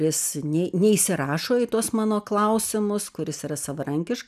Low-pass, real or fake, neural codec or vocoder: 14.4 kHz; real; none